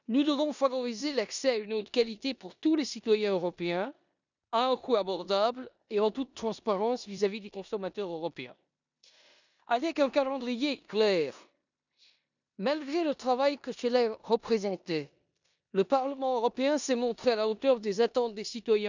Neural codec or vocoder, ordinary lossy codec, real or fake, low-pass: codec, 16 kHz in and 24 kHz out, 0.9 kbps, LongCat-Audio-Codec, four codebook decoder; none; fake; 7.2 kHz